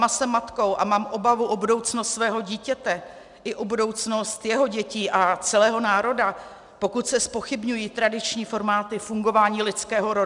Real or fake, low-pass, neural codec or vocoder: real; 10.8 kHz; none